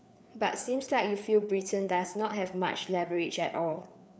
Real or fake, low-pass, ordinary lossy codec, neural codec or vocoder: fake; none; none; codec, 16 kHz, 4 kbps, FreqCodec, larger model